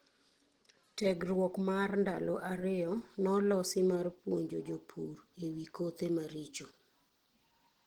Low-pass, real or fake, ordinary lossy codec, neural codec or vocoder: 19.8 kHz; real; Opus, 16 kbps; none